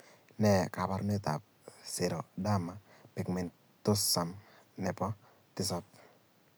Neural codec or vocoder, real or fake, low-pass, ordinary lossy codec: none; real; none; none